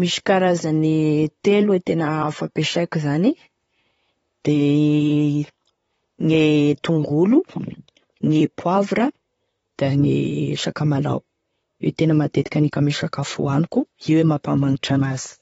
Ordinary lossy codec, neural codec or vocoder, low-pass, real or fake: AAC, 24 kbps; vocoder, 44.1 kHz, 128 mel bands, Pupu-Vocoder; 19.8 kHz; fake